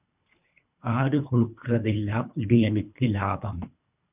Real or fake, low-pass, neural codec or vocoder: fake; 3.6 kHz; codec, 24 kHz, 3 kbps, HILCodec